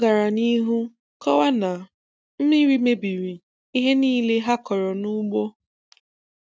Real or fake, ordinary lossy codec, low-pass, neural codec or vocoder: real; none; none; none